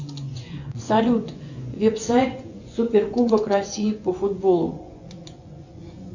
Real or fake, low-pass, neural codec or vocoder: fake; 7.2 kHz; vocoder, 24 kHz, 100 mel bands, Vocos